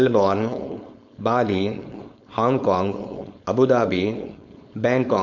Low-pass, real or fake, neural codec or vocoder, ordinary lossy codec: 7.2 kHz; fake; codec, 16 kHz, 4.8 kbps, FACodec; none